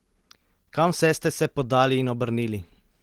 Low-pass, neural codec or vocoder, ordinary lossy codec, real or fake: 19.8 kHz; none; Opus, 16 kbps; real